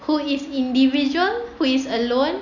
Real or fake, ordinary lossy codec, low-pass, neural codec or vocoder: real; none; 7.2 kHz; none